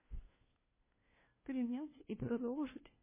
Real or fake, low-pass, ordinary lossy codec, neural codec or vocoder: fake; 3.6 kHz; MP3, 16 kbps; codec, 16 kHz, 1 kbps, FunCodec, trained on LibriTTS, 50 frames a second